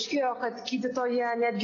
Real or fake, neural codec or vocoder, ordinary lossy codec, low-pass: real; none; AAC, 32 kbps; 7.2 kHz